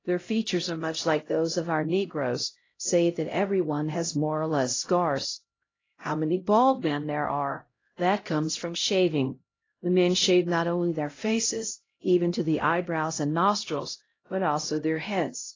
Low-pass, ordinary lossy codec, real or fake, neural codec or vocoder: 7.2 kHz; AAC, 32 kbps; fake; codec, 16 kHz, 0.5 kbps, X-Codec, HuBERT features, trained on LibriSpeech